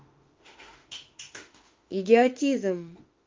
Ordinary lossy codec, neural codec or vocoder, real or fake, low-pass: Opus, 24 kbps; autoencoder, 48 kHz, 32 numbers a frame, DAC-VAE, trained on Japanese speech; fake; 7.2 kHz